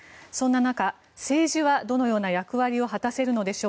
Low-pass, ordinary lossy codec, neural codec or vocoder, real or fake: none; none; none; real